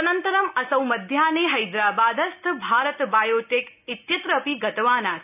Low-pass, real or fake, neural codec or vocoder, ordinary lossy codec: 3.6 kHz; real; none; none